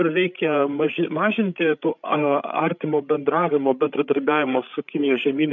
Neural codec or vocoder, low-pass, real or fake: codec, 16 kHz, 8 kbps, FreqCodec, larger model; 7.2 kHz; fake